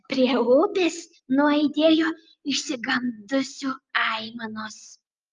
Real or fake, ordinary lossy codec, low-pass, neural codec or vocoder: real; Opus, 32 kbps; 7.2 kHz; none